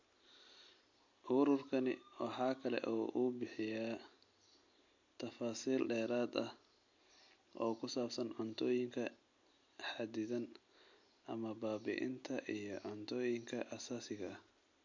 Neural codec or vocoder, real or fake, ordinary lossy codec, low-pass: none; real; MP3, 48 kbps; 7.2 kHz